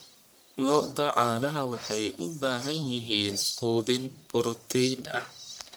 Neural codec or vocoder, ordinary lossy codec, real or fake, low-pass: codec, 44.1 kHz, 1.7 kbps, Pupu-Codec; none; fake; none